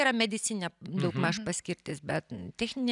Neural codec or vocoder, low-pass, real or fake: none; 10.8 kHz; real